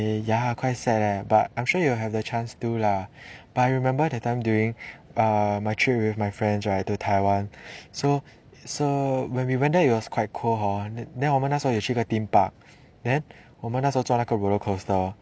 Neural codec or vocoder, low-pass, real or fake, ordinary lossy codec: none; none; real; none